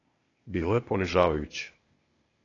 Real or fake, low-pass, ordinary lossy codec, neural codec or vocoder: fake; 7.2 kHz; AAC, 32 kbps; codec, 16 kHz, 0.8 kbps, ZipCodec